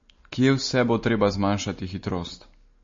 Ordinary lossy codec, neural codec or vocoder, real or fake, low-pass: MP3, 32 kbps; none; real; 7.2 kHz